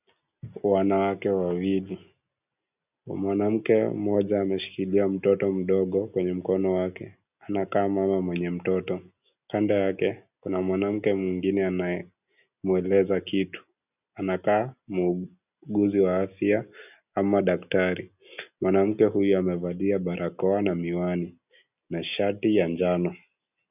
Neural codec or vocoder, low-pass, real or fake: none; 3.6 kHz; real